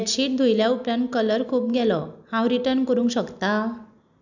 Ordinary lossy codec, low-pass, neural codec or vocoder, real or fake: none; 7.2 kHz; none; real